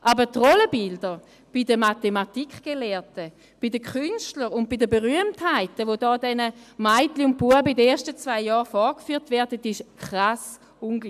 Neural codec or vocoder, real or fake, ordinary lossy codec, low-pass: none; real; AAC, 96 kbps; 14.4 kHz